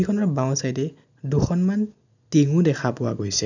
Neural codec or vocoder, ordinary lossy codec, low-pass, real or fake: none; none; 7.2 kHz; real